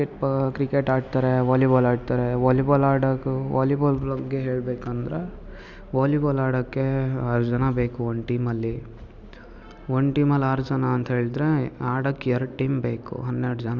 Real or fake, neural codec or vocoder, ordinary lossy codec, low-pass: real; none; none; 7.2 kHz